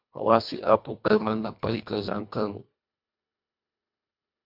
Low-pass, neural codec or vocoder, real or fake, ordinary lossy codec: 5.4 kHz; codec, 24 kHz, 1.5 kbps, HILCodec; fake; MP3, 48 kbps